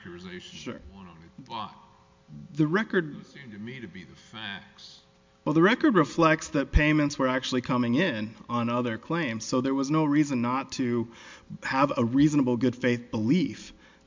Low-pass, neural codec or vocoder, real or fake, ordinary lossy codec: 7.2 kHz; none; real; MP3, 64 kbps